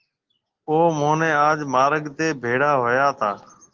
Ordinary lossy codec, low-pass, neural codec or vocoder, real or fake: Opus, 16 kbps; 7.2 kHz; none; real